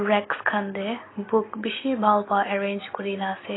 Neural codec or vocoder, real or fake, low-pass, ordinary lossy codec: none; real; 7.2 kHz; AAC, 16 kbps